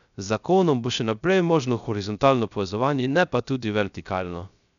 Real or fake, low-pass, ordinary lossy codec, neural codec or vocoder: fake; 7.2 kHz; none; codec, 16 kHz, 0.3 kbps, FocalCodec